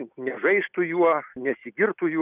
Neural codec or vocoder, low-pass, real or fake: none; 3.6 kHz; real